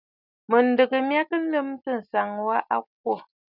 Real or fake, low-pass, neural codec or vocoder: real; 5.4 kHz; none